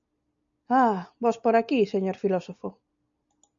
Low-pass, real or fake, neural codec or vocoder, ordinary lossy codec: 7.2 kHz; real; none; MP3, 96 kbps